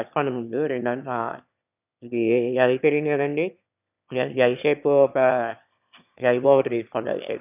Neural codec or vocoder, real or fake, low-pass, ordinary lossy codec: autoencoder, 22.05 kHz, a latent of 192 numbers a frame, VITS, trained on one speaker; fake; 3.6 kHz; none